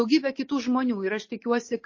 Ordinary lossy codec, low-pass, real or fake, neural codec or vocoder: MP3, 32 kbps; 7.2 kHz; real; none